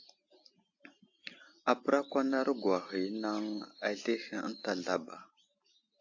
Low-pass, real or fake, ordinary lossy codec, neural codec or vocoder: 7.2 kHz; real; AAC, 48 kbps; none